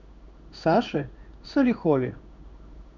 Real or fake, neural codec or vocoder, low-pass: fake; codec, 16 kHz in and 24 kHz out, 1 kbps, XY-Tokenizer; 7.2 kHz